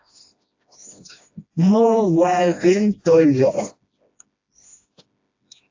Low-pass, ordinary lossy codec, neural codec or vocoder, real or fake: 7.2 kHz; AAC, 48 kbps; codec, 16 kHz, 1 kbps, FreqCodec, smaller model; fake